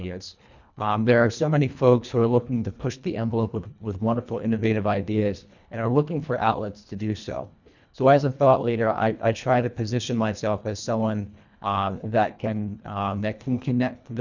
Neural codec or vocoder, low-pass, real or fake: codec, 24 kHz, 1.5 kbps, HILCodec; 7.2 kHz; fake